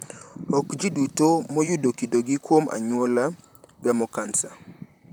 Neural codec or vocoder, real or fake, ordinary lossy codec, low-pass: vocoder, 44.1 kHz, 128 mel bands, Pupu-Vocoder; fake; none; none